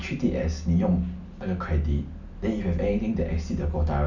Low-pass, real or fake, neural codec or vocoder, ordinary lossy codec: 7.2 kHz; real; none; none